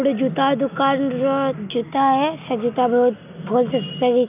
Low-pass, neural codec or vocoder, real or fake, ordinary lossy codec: 3.6 kHz; none; real; none